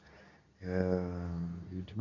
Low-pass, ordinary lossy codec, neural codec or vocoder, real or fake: 7.2 kHz; none; codec, 24 kHz, 0.9 kbps, WavTokenizer, medium speech release version 2; fake